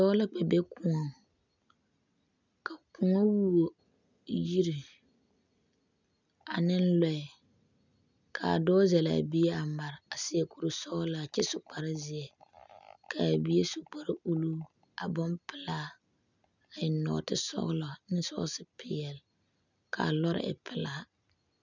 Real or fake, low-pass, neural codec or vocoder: real; 7.2 kHz; none